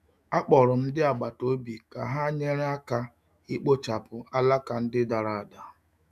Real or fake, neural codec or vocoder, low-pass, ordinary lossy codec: fake; autoencoder, 48 kHz, 128 numbers a frame, DAC-VAE, trained on Japanese speech; 14.4 kHz; none